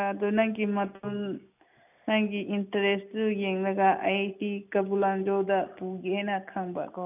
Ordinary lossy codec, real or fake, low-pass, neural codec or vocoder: none; real; 3.6 kHz; none